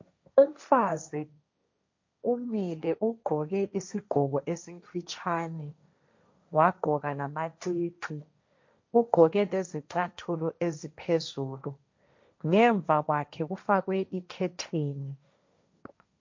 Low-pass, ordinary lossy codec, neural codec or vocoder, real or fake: 7.2 kHz; MP3, 48 kbps; codec, 16 kHz, 1.1 kbps, Voila-Tokenizer; fake